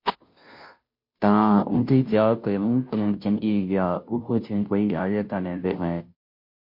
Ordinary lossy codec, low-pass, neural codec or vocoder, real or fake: MP3, 32 kbps; 5.4 kHz; codec, 16 kHz, 0.5 kbps, FunCodec, trained on Chinese and English, 25 frames a second; fake